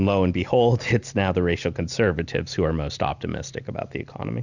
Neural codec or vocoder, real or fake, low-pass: none; real; 7.2 kHz